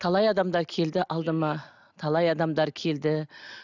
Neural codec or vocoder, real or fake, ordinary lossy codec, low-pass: none; real; none; 7.2 kHz